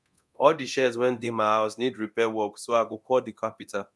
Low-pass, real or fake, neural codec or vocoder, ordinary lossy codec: none; fake; codec, 24 kHz, 0.9 kbps, DualCodec; none